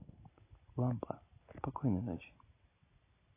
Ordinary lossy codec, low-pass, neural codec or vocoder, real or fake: AAC, 16 kbps; 3.6 kHz; none; real